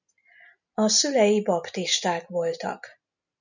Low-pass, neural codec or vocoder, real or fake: 7.2 kHz; none; real